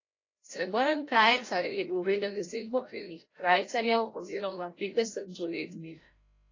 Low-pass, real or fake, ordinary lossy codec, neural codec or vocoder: 7.2 kHz; fake; AAC, 32 kbps; codec, 16 kHz, 0.5 kbps, FreqCodec, larger model